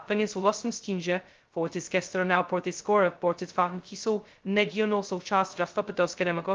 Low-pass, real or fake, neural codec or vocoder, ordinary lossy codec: 7.2 kHz; fake; codec, 16 kHz, 0.2 kbps, FocalCodec; Opus, 32 kbps